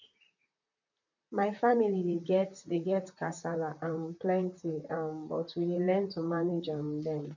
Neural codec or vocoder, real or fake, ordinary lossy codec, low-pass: vocoder, 44.1 kHz, 128 mel bands, Pupu-Vocoder; fake; none; 7.2 kHz